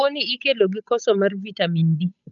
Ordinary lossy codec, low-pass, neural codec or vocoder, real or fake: none; 7.2 kHz; codec, 16 kHz, 8 kbps, FunCodec, trained on Chinese and English, 25 frames a second; fake